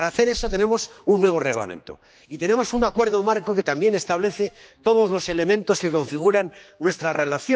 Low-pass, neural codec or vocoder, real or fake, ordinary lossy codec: none; codec, 16 kHz, 2 kbps, X-Codec, HuBERT features, trained on general audio; fake; none